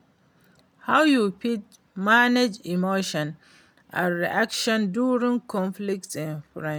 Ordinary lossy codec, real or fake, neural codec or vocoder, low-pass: none; real; none; none